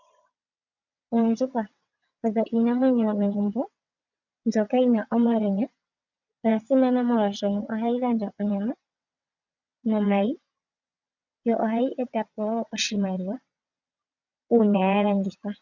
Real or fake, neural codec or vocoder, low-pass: fake; vocoder, 22.05 kHz, 80 mel bands, WaveNeXt; 7.2 kHz